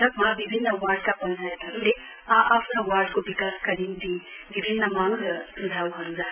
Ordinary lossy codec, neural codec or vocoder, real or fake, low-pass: none; none; real; 3.6 kHz